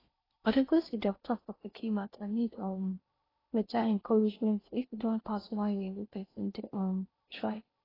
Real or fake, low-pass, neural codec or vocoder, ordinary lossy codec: fake; 5.4 kHz; codec, 16 kHz in and 24 kHz out, 0.6 kbps, FocalCodec, streaming, 4096 codes; AAC, 24 kbps